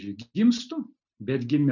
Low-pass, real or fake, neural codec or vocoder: 7.2 kHz; real; none